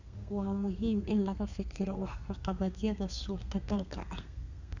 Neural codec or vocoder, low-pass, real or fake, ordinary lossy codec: codec, 32 kHz, 1.9 kbps, SNAC; 7.2 kHz; fake; none